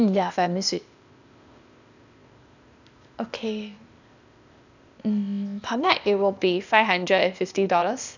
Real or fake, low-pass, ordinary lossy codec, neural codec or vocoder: fake; 7.2 kHz; none; codec, 16 kHz, 0.8 kbps, ZipCodec